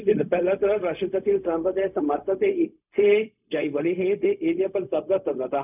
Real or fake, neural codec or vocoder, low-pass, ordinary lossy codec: fake; codec, 16 kHz, 0.4 kbps, LongCat-Audio-Codec; 3.6 kHz; none